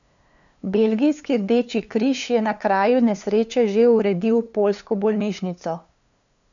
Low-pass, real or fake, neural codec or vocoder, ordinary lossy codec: 7.2 kHz; fake; codec, 16 kHz, 2 kbps, FunCodec, trained on LibriTTS, 25 frames a second; none